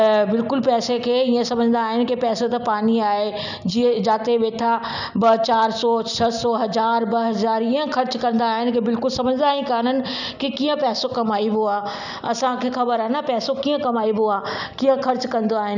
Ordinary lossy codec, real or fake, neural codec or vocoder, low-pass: none; real; none; 7.2 kHz